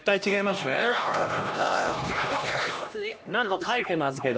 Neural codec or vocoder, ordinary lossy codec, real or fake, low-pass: codec, 16 kHz, 1 kbps, X-Codec, HuBERT features, trained on LibriSpeech; none; fake; none